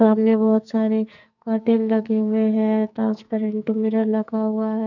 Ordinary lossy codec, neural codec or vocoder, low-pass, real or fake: none; codec, 44.1 kHz, 2.6 kbps, SNAC; 7.2 kHz; fake